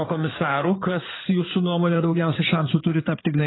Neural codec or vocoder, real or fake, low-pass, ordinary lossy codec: codec, 16 kHz in and 24 kHz out, 2.2 kbps, FireRedTTS-2 codec; fake; 7.2 kHz; AAC, 16 kbps